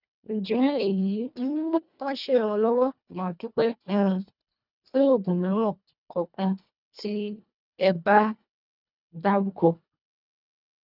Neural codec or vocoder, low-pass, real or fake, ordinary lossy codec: codec, 24 kHz, 1.5 kbps, HILCodec; 5.4 kHz; fake; none